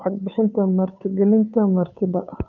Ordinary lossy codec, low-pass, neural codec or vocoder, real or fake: none; 7.2 kHz; codec, 16 kHz, 2 kbps, FunCodec, trained on Chinese and English, 25 frames a second; fake